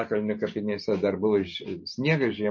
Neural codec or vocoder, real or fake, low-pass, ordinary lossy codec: none; real; 7.2 kHz; MP3, 32 kbps